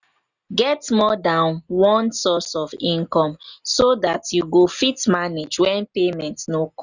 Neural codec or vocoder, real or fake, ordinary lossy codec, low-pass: none; real; none; 7.2 kHz